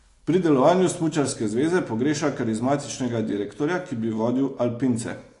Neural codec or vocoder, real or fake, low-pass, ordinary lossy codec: none; real; 10.8 kHz; AAC, 48 kbps